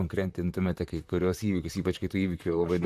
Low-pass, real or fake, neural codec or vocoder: 14.4 kHz; fake; vocoder, 44.1 kHz, 128 mel bands, Pupu-Vocoder